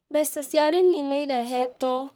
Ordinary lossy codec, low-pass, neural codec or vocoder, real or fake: none; none; codec, 44.1 kHz, 1.7 kbps, Pupu-Codec; fake